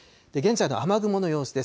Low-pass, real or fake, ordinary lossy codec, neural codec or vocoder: none; real; none; none